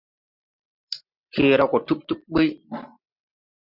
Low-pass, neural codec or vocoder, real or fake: 5.4 kHz; vocoder, 44.1 kHz, 128 mel bands every 512 samples, BigVGAN v2; fake